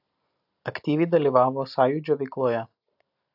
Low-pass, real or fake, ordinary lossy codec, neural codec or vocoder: 5.4 kHz; real; AAC, 48 kbps; none